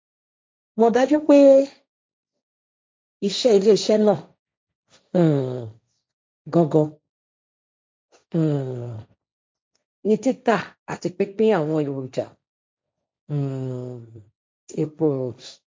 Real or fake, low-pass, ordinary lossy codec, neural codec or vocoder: fake; none; none; codec, 16 kHz, 1.1 kbps, Voila-Tokenizer